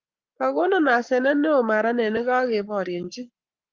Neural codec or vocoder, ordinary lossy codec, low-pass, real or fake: codec, 44.1 kHz, 7.8 kbps, Pupu-Codec; Opus, 24 kbps; 7.2 kHz; fake